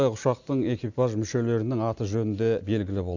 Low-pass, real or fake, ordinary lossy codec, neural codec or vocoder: 7.2 kHz; real; none; none